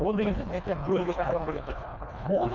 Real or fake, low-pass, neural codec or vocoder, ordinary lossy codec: fake; 7.2 kHz; codec, 24 kHz, 1.5 kbps, HILCodec; none